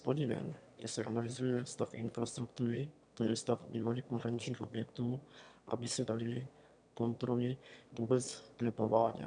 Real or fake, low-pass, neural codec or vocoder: fake; 9.9 kHz; autoencoder, 22.05 kHz, a latent of 192 numbers a frame, VITS, trained on one speaker